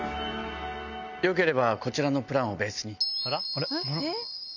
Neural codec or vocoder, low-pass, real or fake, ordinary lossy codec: none; 7.2 kHz; real; none